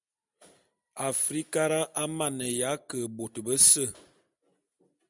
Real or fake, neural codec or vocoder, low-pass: real; none; 10.8 kHz